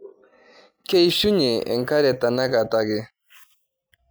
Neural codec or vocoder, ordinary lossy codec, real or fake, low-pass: none; none; real; none